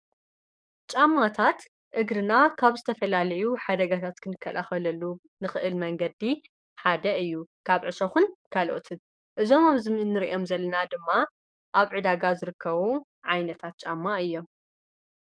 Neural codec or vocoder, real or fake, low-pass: codec, 44.1 kHz, 7.8 kbps, DAC; fake; 9.9 kHz